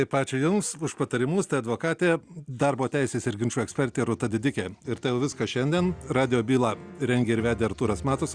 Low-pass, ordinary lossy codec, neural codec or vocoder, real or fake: 9.9 kHz; Opus, 64 kbps; none; real